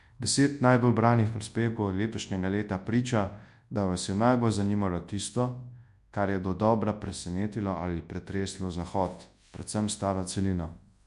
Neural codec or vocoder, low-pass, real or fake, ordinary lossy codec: codec, 24 kHz, 0.9 kbps, WavTokenizer, large speech release; 10.8 kHz; fake; MP3, 64 kbps